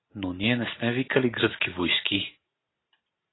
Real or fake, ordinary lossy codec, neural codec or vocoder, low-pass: real; AAC, 16 kbps; none; 7.2 kHz